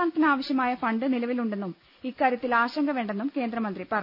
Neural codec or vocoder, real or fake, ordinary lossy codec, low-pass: none; real; none; 5.4 kHz